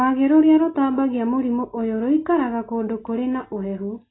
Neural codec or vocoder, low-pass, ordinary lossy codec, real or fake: none; 7.2 kHz; AAC, 16 kbps; real